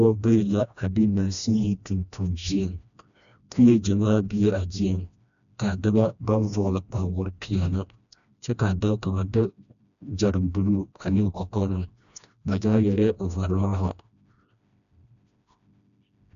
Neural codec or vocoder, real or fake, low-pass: codec, 16 kHz, 1 kbps, FreqCodec, smaller model; fake; 7.2 kHz